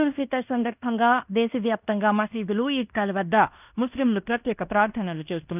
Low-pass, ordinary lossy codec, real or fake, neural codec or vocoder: 3.6 kHz; none; fake; codec, 16 kHz in and 24 kHz out, 0.9 kbps, LongCat-Audio-Codec, fine tuned four codebook decoder